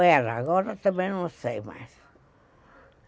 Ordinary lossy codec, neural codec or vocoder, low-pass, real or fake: none; none; none; real